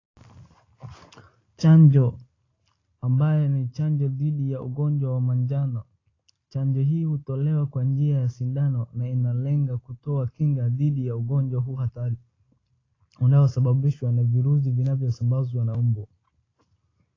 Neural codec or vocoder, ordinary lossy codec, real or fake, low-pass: none; AAC, 32 kbps; real; 7.2 kHz